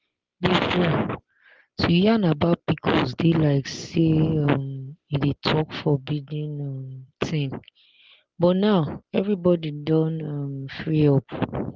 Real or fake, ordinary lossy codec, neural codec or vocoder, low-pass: real; Opus, 16 kbps; none; 7.2 kHz